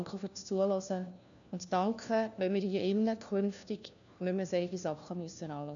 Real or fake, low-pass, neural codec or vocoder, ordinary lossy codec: fake; 7.2 kHz; codec, 16 kHz, 1 kbps, FunCodec, trained on LibriTTS, 50 frames a second; none